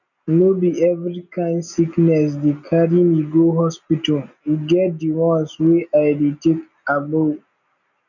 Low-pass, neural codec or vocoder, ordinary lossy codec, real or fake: 7.2 kHz; none; none; real